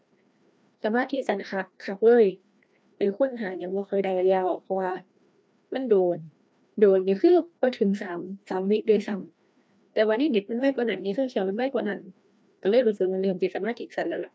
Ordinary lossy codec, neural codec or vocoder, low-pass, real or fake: none; codec, 16 kHz, 1 kbps, FreqCodec, larger model; none; fake